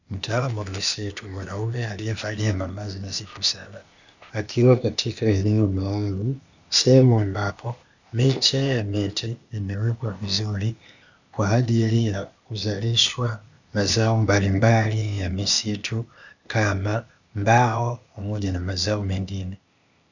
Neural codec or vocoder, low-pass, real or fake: codec, 16 kHz, 0.8 kbps, ZipCodec; 7.2 kHz; fake